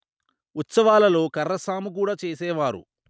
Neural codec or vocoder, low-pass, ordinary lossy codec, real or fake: none; none; none; real